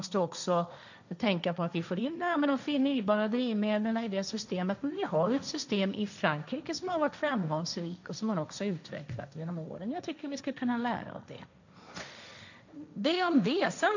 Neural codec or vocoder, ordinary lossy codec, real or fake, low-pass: codec, 16 kHz, 1.1 kbps, Voila-Tokenizer; none; fake; 7.2 kHz